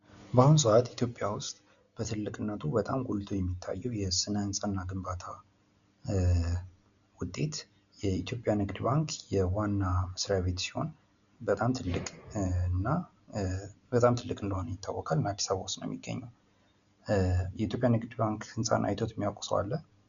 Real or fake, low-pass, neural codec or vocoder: real; 7.2 kHz; none